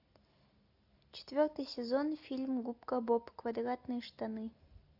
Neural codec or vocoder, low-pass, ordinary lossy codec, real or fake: none; 5.4 kHz; AAC, 48 kbps; real